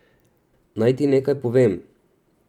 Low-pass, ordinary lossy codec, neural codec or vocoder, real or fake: 19.8 kHz; none; vocoder, 44.1 kHz, 128 mel bands every 256 samples, BigVGAN v2; fake